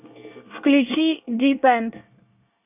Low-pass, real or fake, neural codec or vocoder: 3.6 kHz; fake; codec, 24 kHz, 1 kbps, SNAC